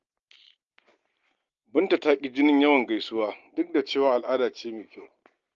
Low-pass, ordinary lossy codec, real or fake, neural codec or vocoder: 7.2 kHz; Opus, 32 kbps; real; none